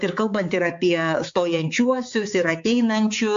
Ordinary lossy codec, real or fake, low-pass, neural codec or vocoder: AAC, 64 kbps; fake; 7.2 kHz; codec, 16 kHz, 4 kbps, X-Codec, HuBERT features, trained on balanced general audio